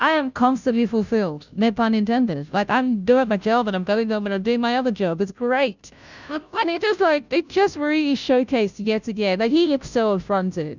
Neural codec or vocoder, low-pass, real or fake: codec, 16 kHz, 0.5 kbps, FunCodec, trained on Chinese and English, 25 frames a second; 7.2 kHz; fake